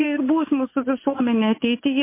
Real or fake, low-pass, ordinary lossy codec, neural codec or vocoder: fake; 3.6 kHz; MP3, 24 kbps; vocoder, 24 kHz, 100 mel bands, Vocos